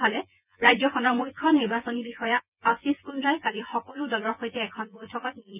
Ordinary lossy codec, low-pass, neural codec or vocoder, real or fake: none; 3.6 kHz; vocoder, 24 kHz, 100 mel bands, Vocos; fake